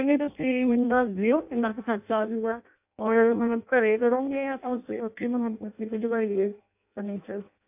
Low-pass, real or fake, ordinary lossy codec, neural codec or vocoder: 3.6 kHz; fake; AAC, 32 kbps; codec, 16 kHz in and 24 kHz out, 0.6 kbps, FireRedTTS-2 codec